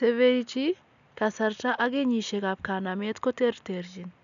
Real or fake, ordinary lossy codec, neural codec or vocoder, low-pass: real; none; none; 7.2 kHz